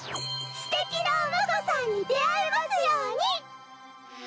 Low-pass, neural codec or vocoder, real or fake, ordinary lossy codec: none; none; real; none